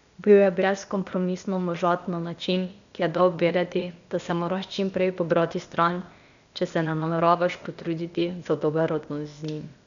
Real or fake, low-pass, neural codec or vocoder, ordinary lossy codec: fake; 7.2 kHz; codec, 16 kHz, 0.8 kbps, ZipCodec; none